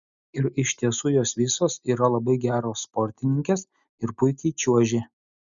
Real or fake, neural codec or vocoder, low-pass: real; none; 7.2 kHz